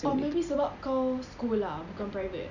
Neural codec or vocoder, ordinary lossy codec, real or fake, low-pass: none; none; real; 7.2 kHz